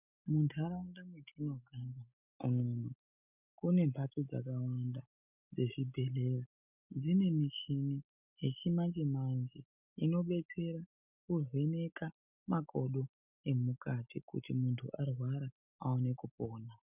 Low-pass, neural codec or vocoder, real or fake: 3.6 kHz; none; real